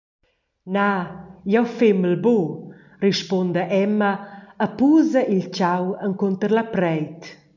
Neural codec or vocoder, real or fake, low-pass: none; real; 7.2 kHz